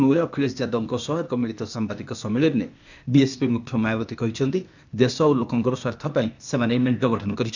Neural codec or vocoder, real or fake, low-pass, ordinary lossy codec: codec, 16 kHz, 0.8 kbps, ZipCodec; fake; 7.2 kHz; none